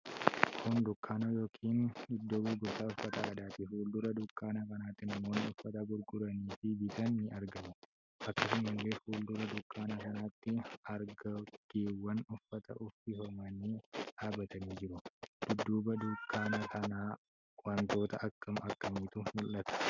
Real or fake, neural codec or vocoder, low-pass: real; none; 7.2 kHz